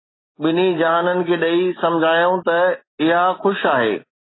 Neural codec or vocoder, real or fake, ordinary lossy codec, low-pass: none; real; AAC, 16 kbps; 7.2 kHz